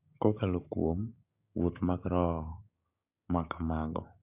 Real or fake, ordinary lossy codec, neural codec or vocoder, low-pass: fake; AAC, 32 kbps; codec, 16 kHz, 6 kbps, DAC; 3.6 kHz